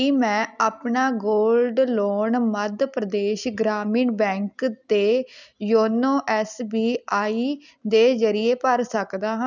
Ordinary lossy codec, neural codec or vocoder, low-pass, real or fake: none; none; 7.2 kHz; real